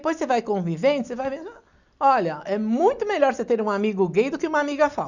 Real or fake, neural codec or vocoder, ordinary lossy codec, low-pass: real; none; none; 7.2 kHz